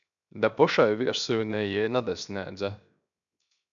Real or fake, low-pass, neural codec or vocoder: fake; 7.2 kHz; codec, 16 kHz, 0.7 kbps, FocalCodec